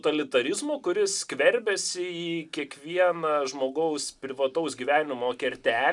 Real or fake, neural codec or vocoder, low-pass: real; none; 10.8 kHz